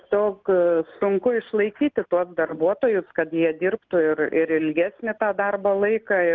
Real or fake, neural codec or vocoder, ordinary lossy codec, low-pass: real; none; Opus, 24 kbps; 7.2 kHz